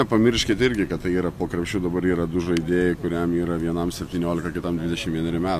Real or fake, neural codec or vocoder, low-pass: real; none; 14.4 kHz